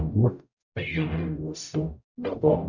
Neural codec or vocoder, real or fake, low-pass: codec, 44.1 kHz, 0.9 kbps, DAC; fake; 7.2 kHz